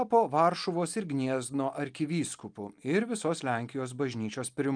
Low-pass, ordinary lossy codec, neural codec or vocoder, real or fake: 9.9 kHz; Opus, 32 kbps; none; real